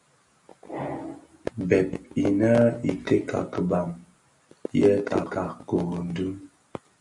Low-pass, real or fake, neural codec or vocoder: 10.8 kHz; real; none